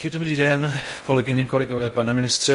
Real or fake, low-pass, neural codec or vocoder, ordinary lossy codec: fake; 10.8 kHz; codec, 16 kHz in and 24 kHz out, 0.6 kbps, FocalCodec, streaming, 4096 codes; MP3, 48 kbps